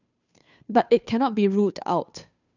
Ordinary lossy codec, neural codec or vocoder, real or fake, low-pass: none; codec, 16 kHz, 2 kbps, FunCodec, trained on Chinese and English, 25 frames a second; fake; 7.2 kHz